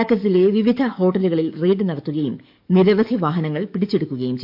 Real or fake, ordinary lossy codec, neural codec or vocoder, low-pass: fake; MP3, 48 kbps; codec, 44.1 kHz, 7.8 kbps, DAC; 5.4 kHz